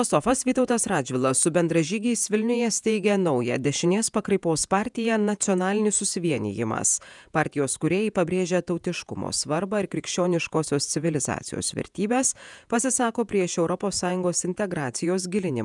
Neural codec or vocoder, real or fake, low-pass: vocoder, 48 kHz, 128 mel bands, Vocos; fake; 10.8 kHz